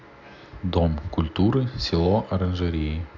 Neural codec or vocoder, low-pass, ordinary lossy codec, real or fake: none; 7.2 kHz; none; real